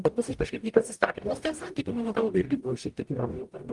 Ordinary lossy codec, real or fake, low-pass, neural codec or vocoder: Opus, 24 kbps; fake; 10.8 kHz; codec, 44.1 kHz, 0.9 kbps, DAC